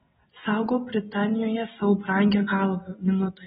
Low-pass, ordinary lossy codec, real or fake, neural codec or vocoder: 7.2 kHz; AAC, 16 kbps; real; none